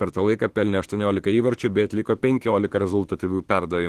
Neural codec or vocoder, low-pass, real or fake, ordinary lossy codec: autoencoder, 48 kHz, 32 numbers a frame, DAC-VAE, trained on Japanese speech; 14.4 kHz; fake; Opus, 16 kbps